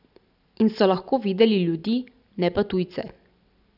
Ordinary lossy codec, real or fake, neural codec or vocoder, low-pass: none; real; none; 5.4 kHz